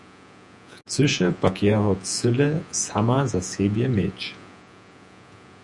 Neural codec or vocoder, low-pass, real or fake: vocoder, 48 kHz, 128 mel bands, Vocos; 10.8 kHz; fake